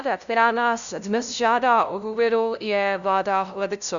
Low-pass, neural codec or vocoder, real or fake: 7.2 kHz; codec, 16 kHz, 0.5 kbps, FunCodec, trained on LibriTTS, 25 frames a second; fake